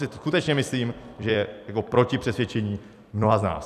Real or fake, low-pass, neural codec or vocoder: fake; 14.4 kHz; vocoder, 44.1 kHz, 128 mel bands every 256 samples, BigVGAN v2